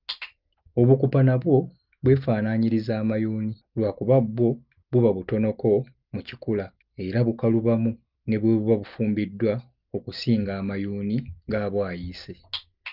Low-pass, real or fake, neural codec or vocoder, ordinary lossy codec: 5.4 kHz; real; none; Opus, 24 kbps